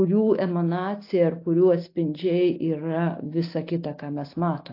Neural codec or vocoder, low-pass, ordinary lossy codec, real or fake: none; 5.4 kHz; AAC, 32 kbps; real